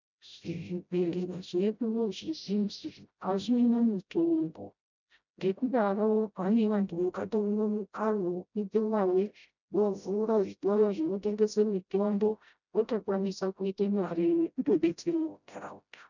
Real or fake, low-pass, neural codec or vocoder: fake; 7.2 kHz; codec, 16 kHz, 0.5 kbps, FreqCodec, smaller model